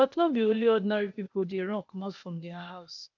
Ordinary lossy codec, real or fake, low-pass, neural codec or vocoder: none; fake; 7.2 kHz; codec, 16 kHz, 0.8 kbps, ZipCodec